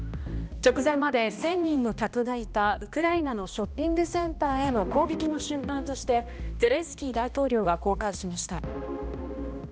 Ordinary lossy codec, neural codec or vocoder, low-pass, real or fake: none; codec, 16 kHz, 1 kbps, X-Codec, HuBERT features, trained on balanced general audio; none; fake